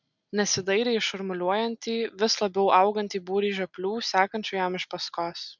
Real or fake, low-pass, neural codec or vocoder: real; 7.2 kHz; none